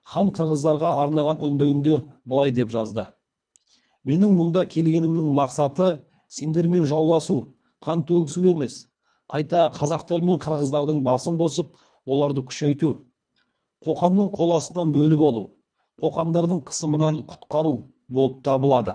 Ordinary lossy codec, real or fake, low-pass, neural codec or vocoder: none; fake; 9.9 kHz; codec, 24 kHz, 1.5 kbps, HILCodec